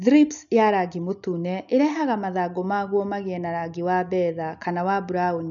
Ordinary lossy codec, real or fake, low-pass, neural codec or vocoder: none; real; 7.2 kHz; none